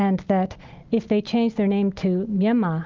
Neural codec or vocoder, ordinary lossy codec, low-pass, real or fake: none; Opus, 24 kbps; 7.2 kHz; real